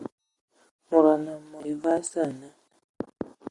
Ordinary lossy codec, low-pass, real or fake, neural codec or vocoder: Opus, 64 kbps; 10.8 kHz; real; none